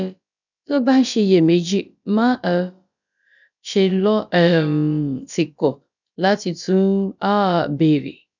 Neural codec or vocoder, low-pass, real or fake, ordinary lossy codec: codec, 16 kHz, about 1 kbps, DyCAST, with the encoder's durations; 7.2 kHz; fake; none